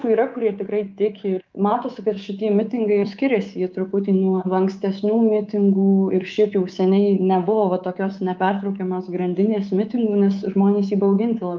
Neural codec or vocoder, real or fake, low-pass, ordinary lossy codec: codec, 24 kHz, 3.1 kbps, DualCodec; fake; 7.2 kHz; Opus, 32 kbps